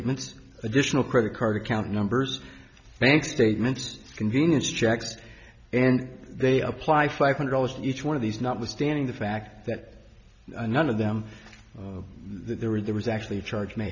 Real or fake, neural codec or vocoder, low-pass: real; none; 7.2 kHz